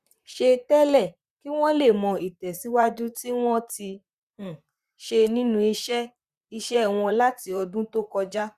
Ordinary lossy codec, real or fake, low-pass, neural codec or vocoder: Opus, 64 kbps; fake; 14.4 kHz; vocoder, 44.1 kHz, 128 mel bands, Pupu-Vocoder